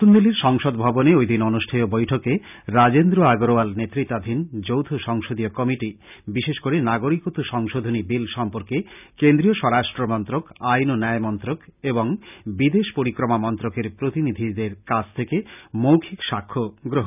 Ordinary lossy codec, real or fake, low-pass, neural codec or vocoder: none; real; 3.6 kHz; none